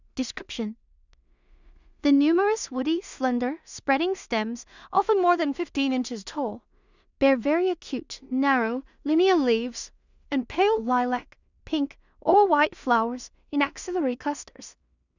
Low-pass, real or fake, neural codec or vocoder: 7.2 kHz; fake; codec, 16 kHz in and 24 kHz out, 0.4 kbps, LongCat-Audio-Codec, two codebook decoder